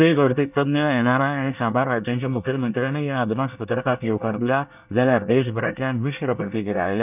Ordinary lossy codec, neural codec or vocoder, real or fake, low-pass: none; codec, 24 kHz, 1 kbps, SNAC; fake; 3.6 kHz